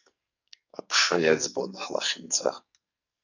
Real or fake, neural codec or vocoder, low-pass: fake; codec, 44.1 kHz, 2.6 kbps, SNAC; 7.2 kHz